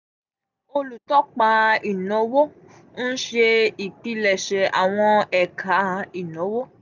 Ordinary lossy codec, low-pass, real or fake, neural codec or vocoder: none; 7.2 kHz; real; none